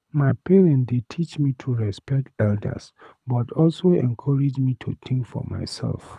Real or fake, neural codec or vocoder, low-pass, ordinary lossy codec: fake; codec, 24 kHz, 6 kbps, HILCodec; none; none